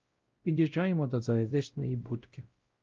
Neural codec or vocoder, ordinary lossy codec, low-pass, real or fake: codec, 16 kHz, 0.5 kbps, X-Codec, WavLM features, trained on Multilingual LibriSpeech; Opus, 24 kbps; 7.2 kHz; fake